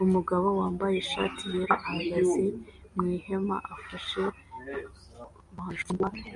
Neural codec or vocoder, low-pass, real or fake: none; 10.8 kHz; real